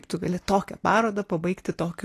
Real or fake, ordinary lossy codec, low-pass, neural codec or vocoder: real; AAC, 48 kbps; 14.4 kHz; none